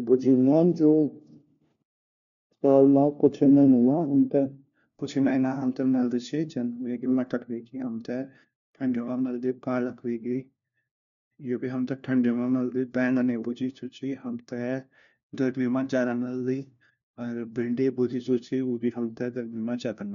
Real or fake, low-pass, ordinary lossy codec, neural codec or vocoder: fake; 7.2 kHz; none; codec, 16 kHz, 1 kbps, FunCodec, trained on LibriTTS, 50 frames a second